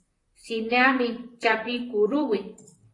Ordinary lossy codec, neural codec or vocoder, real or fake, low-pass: AAC, 32 kbps; vocoder, 44.1 kHz, 128 mel bands, Pupu-Vocoder; fake; 10.8 kHz